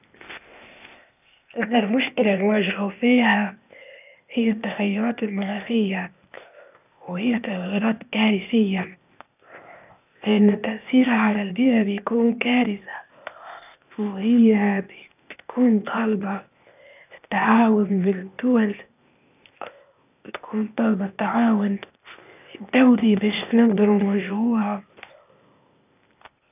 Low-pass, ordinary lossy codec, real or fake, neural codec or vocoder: 3.6 kHz; none; fake; codec, 16 kHz, 0.8 kbps, ZipCodec